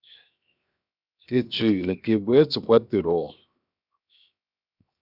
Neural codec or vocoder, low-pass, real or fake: codec, 16 kHz, 0.7 kbps, FocalCodec; 5.4 kHz; fake